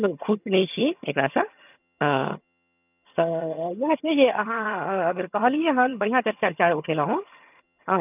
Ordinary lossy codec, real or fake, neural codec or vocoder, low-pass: none; fake; vocoder, 22.05 kHz, 80 mel bands, HiFi-GAN; 3.6 kHz